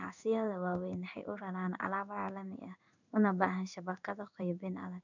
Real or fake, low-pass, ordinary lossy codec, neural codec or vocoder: fake; 7.2 kHz; none; codec, 16 kHz in and 24 kHz out, 1 kbps, XY-Tokenizer